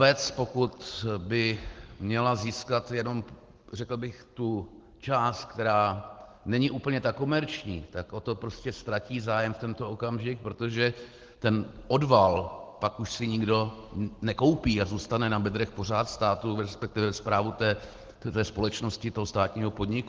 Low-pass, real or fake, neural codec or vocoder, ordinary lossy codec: 7.2 kHz; real; none; Opus, 16 kbps